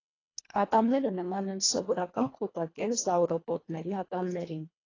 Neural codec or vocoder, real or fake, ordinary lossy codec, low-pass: codec, 24 kHz, 1.5 kbps, HILCodec; fake; AAC, 32 kbps; 7.2 kHz